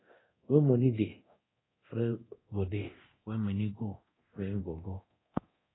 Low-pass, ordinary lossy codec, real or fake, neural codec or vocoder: 7.2 kHz; AAC, 16 kbps; fake; codec, 24 kHz, 0.9 kbps, DualCodec